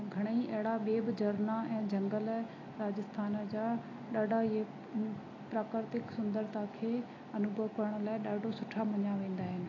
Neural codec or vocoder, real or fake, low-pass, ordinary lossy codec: none; real; 7.2 kHz; AAC, 32 kbps